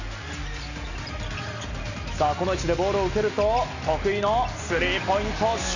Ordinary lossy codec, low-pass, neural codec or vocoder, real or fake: none; 7.2 kHz; none; real